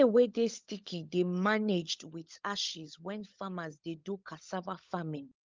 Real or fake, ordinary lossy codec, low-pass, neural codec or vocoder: fake; Opus, 32 kbps; 7.2 kHz; codec, 16 kHz, 16 kbps, FunCodec, trained on LibriTTS, 50 frames a second